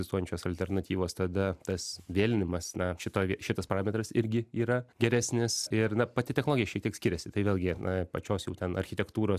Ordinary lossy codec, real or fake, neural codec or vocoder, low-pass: AAC, 96 kbps; real; none; 14.4 kHz